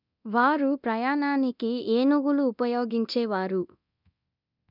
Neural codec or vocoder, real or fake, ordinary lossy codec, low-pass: codec, 24 kHz, 0.9 kbps, DualCodec; fake; none; 5.4 kHz